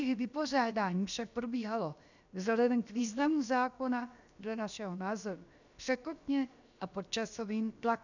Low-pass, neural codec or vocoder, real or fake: 7.2 kHz; codec, 16 kHz, about 1 kbps, DyCAST, with the encoder's durations; fake